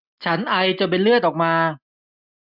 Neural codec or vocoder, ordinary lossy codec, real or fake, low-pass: none; none; real; 5.4 kHz